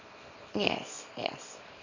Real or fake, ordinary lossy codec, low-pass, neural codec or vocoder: fake; MP3, 48 kbps; 7.2 kHz; codec, 24 kHz, 0.9 kbps, WavTokenizer, small release